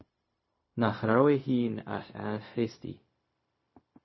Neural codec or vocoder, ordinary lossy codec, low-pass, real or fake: codec, 16 kHz, 0.4 kbps, LongCat-Audio-Codec; MP3, 24 kbps; 7.2 kHz; fake